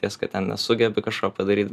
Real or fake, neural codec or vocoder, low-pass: real; none; 14.4 kHz